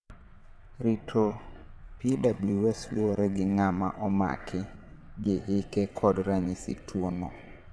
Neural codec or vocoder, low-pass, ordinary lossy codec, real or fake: vocoder, 22.05 kHz, 80 mel bands, WaveNeXt; none; none; fake